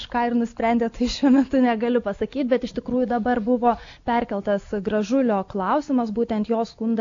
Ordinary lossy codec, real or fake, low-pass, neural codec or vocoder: AAC, 48 kbps; real; 7.2 kHz; none